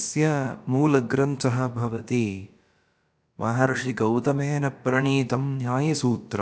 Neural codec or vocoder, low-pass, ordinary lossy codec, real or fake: codec, 16 kHz, about 1 kbps, DyCAST, with the encoder's durations; none; none; fake